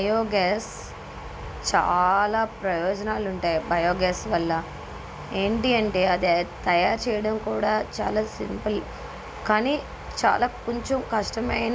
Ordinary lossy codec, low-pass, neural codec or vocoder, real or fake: none; none; none; real